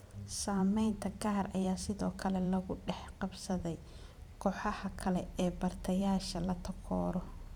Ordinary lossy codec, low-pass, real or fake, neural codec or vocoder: none; 19.8 kHz; fake; vocoder, 44.1 kHz, 128 mel bands every 512 samples, BigVGAN v2